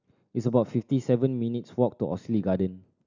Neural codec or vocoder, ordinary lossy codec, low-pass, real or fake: none; none; 7.2 kHz; real